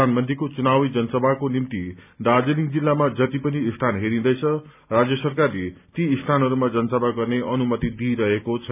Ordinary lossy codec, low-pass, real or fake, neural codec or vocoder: none; 3.6 kHz; real; none